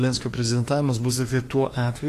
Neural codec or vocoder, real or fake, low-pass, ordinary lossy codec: autoencoder, 48 kHz, 32 numbers a frame, DAC-VAE, trained on Japanese speech; fake; 14.4 kHz; AAC, 48 kbps